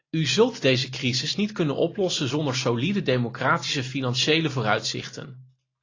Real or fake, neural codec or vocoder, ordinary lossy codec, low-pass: real; none; AAC, 32 kbps; 7.2 kHz